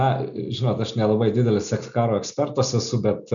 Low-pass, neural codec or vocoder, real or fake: 7.2 kHz; none; real